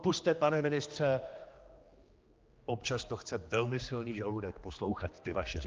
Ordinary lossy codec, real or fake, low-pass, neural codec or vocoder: Opus, 24 kbps; fake; 7.2 kHz; codec, 16 kHz, 2 kbps, X-Codec, HuBERT features, trained on general audio